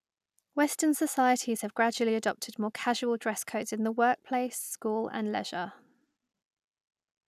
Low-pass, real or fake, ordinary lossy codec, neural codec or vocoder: 14.4 kHz; real; none; none